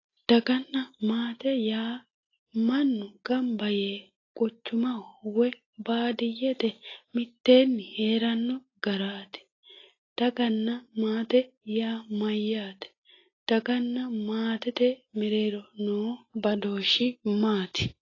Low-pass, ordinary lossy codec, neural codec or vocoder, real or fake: 7.2 kHz; AAC, 32 kbps; none; real